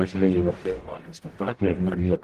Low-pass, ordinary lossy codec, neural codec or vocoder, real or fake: 14.4 kHz; Opus, 16 kbps; codec, 44.1 kHz, 0.9 kbps, DAC; fake